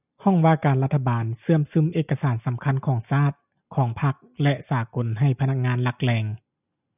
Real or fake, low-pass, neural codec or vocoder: real; 3.6 kHz; none